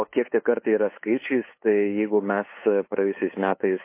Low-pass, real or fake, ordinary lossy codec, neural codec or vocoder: 3.6 kHz; fake; MP3, 24 kbps; codec, 16 kHz, 8 kbps, FunCodec, trained on LibriTTS, 25 frames a second